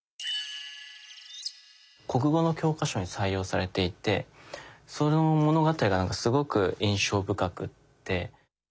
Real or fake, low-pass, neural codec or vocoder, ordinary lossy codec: real; none; none; none